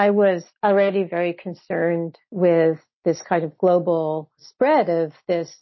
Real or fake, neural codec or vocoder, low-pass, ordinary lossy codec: real; none; 7.2 kHz; MP3, 24 kbps